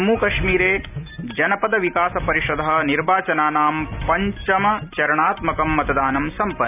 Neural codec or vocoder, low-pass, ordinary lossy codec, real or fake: none; 3.6 kHz; none; real